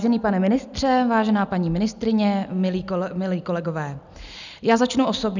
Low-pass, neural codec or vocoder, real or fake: 7.2 kHz; none; real